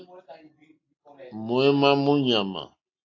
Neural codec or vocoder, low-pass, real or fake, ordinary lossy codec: none; 7.2 kHz; real; AAC, 48 kbps